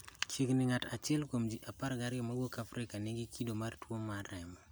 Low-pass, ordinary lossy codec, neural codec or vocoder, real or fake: none; none; none; real